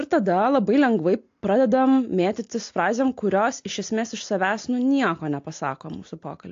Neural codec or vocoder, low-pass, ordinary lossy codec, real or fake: none; 7.2 kHz; AAC, 48 kbps; real